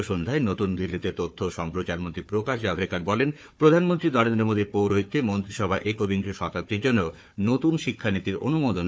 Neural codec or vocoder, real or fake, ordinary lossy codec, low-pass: codec, 16 kHz, 4 kbps, FunCodec, trained on Chinese and English, 50 frames a second; fake; none; none